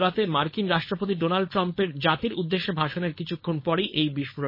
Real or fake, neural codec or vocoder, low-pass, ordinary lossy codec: fake; codec, 16 kHz, 4.8 kbps, FACodec; 5.4 kHz; MP3, 24 kbps